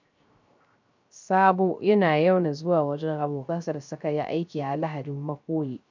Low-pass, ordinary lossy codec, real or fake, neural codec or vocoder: 7.2 kHz; MP3, 64 kbps; fake; codec, 16 kHz, 0.3 kbps, FocalCodec